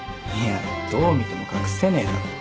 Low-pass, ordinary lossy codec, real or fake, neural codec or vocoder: none; none; real; none